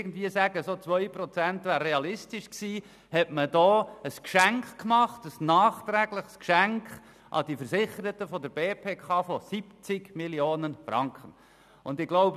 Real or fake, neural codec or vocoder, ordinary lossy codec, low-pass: real; none; none; 14.4 kHz